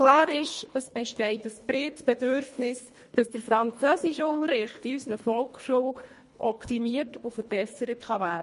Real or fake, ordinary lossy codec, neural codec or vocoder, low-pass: fake; MP3, 48 kbps; codec, 24 kHz, 1.5 kbps, HILCodec; 10.8 kHz